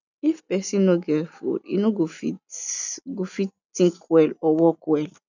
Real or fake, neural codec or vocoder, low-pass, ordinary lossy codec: real; none; 7.2 kHz; none